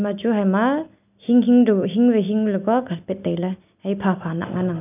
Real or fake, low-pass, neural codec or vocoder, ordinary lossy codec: real; 3.6 kHz; none; none